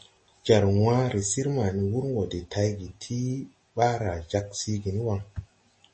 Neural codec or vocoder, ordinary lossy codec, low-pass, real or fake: none; MP3, 32 kbps; 9.9 kHz; real